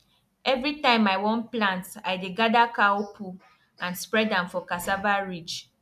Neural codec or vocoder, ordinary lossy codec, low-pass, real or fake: none; none; 14.4 kHz; real